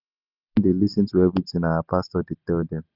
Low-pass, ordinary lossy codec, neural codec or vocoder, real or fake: 5.4 kHz; Opus, 64 kbps; none; real